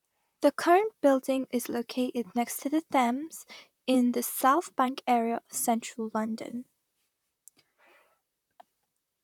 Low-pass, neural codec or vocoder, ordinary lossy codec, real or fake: 19.8 kHz; vocoder, 44.1 kHz, 128 mel bands, Pupu-Vocoder; none; fake